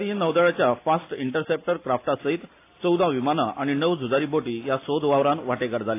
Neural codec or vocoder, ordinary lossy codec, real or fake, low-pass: none; AAC, 24 kbps; real; 3.6 kHz